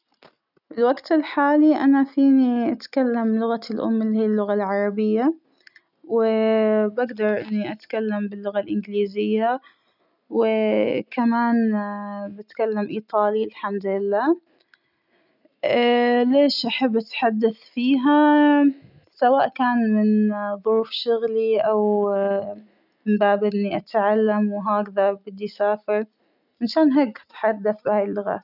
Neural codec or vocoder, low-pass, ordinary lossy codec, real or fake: none; 5.4 kHz; none; real